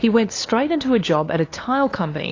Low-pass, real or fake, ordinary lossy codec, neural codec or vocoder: 7.2 kHz; fake; AAC, 32 kbps; codec, 16 kHz, 2 kbps, FunCodec, trained on LibriTTS, 25 frames a second